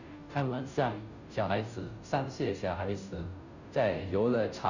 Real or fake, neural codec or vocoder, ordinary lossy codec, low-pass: fake; codec, 16 kHz, 0.5 kbps, FunCodec, trained on Chinese and English, 25 frames a second; none; 7.2 kHz